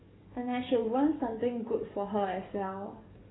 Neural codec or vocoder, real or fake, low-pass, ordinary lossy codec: codec, 44.1 kHz, 7.8 kbps, DAC; fake; 7.2 kHz; AAC, 16 kbps